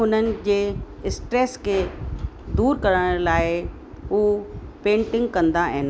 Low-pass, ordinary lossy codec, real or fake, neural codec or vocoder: none; none; real; none